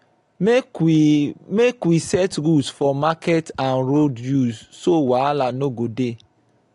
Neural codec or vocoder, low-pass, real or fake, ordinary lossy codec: none; 10.8 kHz; real; AAC, 48 kbps